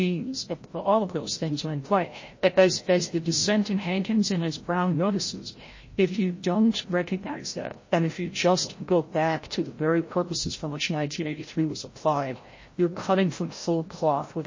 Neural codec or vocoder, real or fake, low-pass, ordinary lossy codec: codec, 16 kHz, 0.5 kbps, FreqCodec, larger model; fake; 7.2 kHz; MP3, 32 kbps